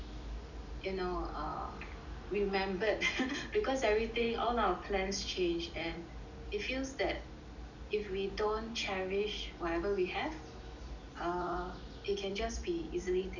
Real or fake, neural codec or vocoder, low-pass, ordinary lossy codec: real; none; 7.2 kHz; none